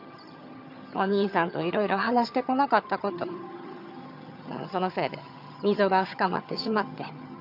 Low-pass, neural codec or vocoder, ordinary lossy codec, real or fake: 5.4 kHz; vocoder, 22.05 kHz, 80 mel bands, HiFi-GAN; none; fake